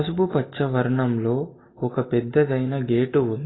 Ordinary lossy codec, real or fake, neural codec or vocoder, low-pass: AAC, 16 kbps; real; none; 7.2 kHz